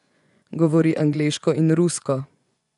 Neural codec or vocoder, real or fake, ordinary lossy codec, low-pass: vocoder, 24 kHz, 100 mel bands, Vocos; fake; none; 10.8 kHz